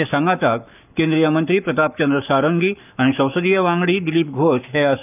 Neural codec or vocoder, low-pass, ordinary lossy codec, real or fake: codec, 44.1 kHz, 7.8 kbps, Pupu-Codec; 3.6 kHz; none; fake